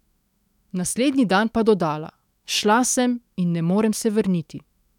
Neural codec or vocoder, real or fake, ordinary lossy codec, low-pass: autoencoder, 48 kHz, 128 numbers a frame, DAC-VAE, trained on Japanese speech; fake; none; 19.8 kHz